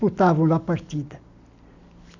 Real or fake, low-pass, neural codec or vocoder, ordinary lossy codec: real; 7.2 kHz; none; none